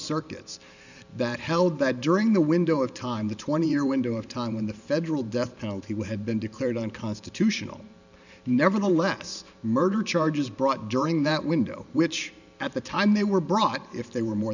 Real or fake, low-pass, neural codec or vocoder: fake; 7.2 kHz; vocoder, 44.1 kHz, 128 mel bands every 512 samples, BigVGAN v2